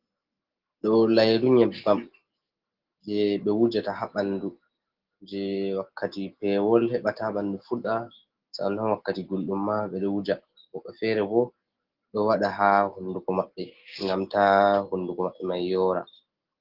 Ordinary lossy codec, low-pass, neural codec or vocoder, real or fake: Opus, 16 kbps; 5.4 kHz; none; real